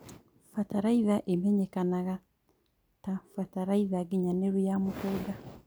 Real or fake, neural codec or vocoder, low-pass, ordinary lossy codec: real; none; none; none